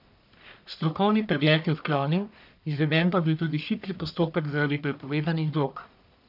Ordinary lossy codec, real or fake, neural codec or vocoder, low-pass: none; fake; codec, 44.1 kHz, 1.7 kbps, Pupu-Codec; 5.4 kHz